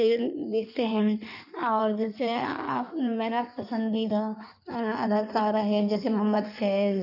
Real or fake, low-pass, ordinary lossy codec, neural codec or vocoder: fake; 5.4 kHz; none; codec, 16 kHz in and 24 kHz out, 1.1 kbps, FireRedTTS-2 codec